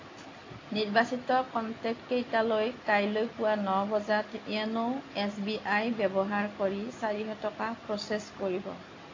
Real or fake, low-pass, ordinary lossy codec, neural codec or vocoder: real; 7.2 kHz; AAC, 32 kbps; none